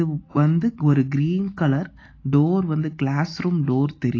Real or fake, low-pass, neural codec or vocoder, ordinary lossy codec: real; 7.2 kHz; none; AAC, 32 kbps